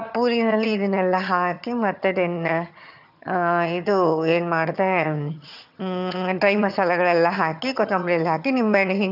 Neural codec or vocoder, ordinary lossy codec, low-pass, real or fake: vocoder, 22.05 kHz, 80 mel bands, HiFi-GAN; none; 5.4 kHz; fake